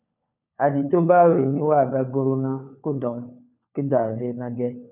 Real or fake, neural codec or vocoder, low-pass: fake; codec, 16 kHz, 4 kbps, FunCodec, trained on LibriTTS, 50 frames a second; 3.6 kHz